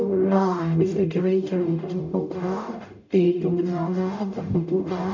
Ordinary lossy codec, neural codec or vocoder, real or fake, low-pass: MP3, 64 kbps; codec, 44.1 kHz, 0.9 kbps, DAC; fake; 7.2 kHz